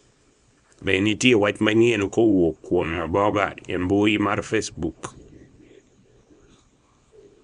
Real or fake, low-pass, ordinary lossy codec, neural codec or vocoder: fake; 10.8 kHz; none; codec, 24 kHz, 0.9 kbps, WavTokenizer, small release